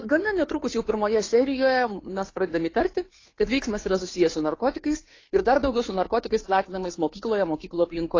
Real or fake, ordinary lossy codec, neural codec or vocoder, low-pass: fake; AAC, 32 kbps; codec, 16 kHz, 2 kbps, FunCodec, trained on Chinese and English, 25 frames a second; 7.2 kHz